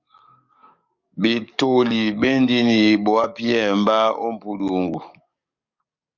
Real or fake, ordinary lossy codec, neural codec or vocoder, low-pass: fake; Opus, 64 kbps; codec, 44.1 kHz, 7.8 kbps, DAC; 7.2 kHz